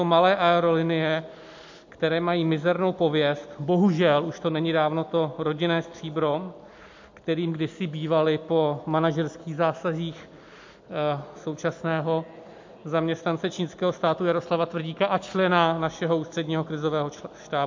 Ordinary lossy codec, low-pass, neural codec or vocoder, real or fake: MP3, 48 kbps; 7.2 kHz; none; real